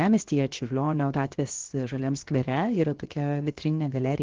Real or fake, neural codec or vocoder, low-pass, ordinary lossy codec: fake; codec, 16 kHz, 0.7 kbps, FocalCodec; 7.2 kHz; Opus, 16 kbps